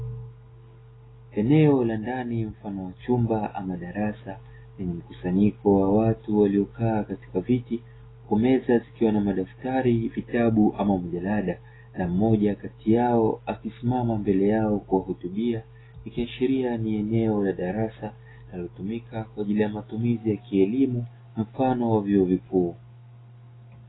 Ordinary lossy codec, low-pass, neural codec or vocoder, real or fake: AAC, 16 kbps; 7.2 kHz; none; real